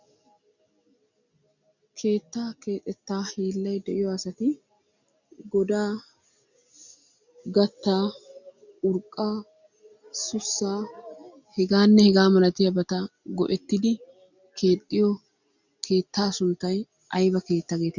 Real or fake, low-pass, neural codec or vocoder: real; 7.2 kHz; none